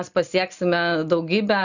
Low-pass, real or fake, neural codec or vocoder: 7.2 kHz; real; none